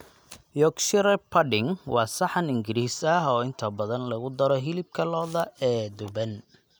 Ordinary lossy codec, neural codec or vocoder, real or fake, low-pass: none; none; real; none